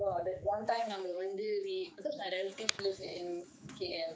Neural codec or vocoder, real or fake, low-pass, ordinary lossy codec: codec, 16 kHz, 4 kbps, X-Codec, HuBERT features, trained on general audio; fake; none; none